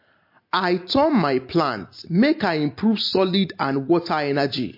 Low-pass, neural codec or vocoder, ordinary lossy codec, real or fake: 5.4 kHz; none; MP3, 32 kbps; real